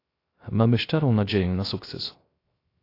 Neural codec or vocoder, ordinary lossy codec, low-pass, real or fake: codec, 16 kHz, 0.3 kbps, FocalCodec; AAC, 32 kbps; 5.4 kHz; fake